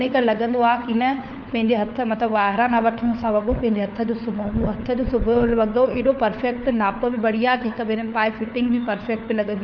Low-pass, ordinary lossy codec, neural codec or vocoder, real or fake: none; none; codec, 16 kHz, 4 kbps, FunCodec, trained on LibriTTS, 50 frames a second; fake